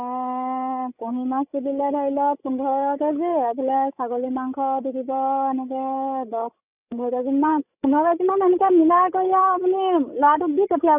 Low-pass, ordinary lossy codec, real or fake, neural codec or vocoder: 3.6 kHz; none; fake; codec, 16 kHz, 16 kbps, FreqCodec, larger model